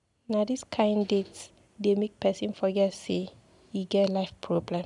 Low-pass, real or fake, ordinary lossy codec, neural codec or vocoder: 10.8 kHz; real; none; none